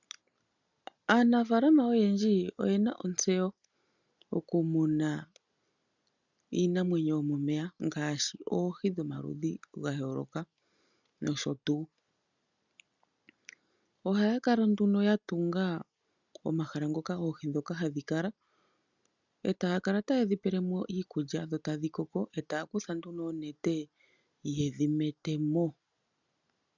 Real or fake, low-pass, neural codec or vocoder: real; 7.2 kHz; none